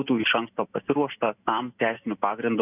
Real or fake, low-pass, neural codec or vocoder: real; 3.6 kHz; none